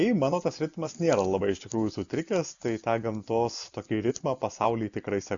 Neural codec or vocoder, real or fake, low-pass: none; real; 7.2 kHz